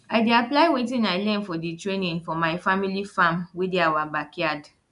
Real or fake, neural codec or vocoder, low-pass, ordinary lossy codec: real; none; 10.8 kHz; none